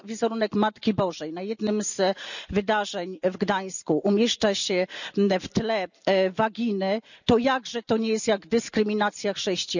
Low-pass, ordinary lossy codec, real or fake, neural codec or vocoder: 7.2 kHz; none; real; none